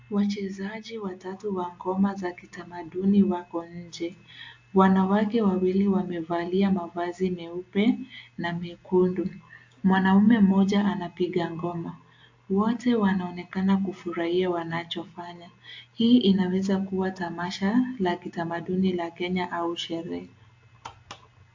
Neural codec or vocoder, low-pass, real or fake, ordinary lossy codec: none; 7.2 kHz; real; AAC, 48 kbps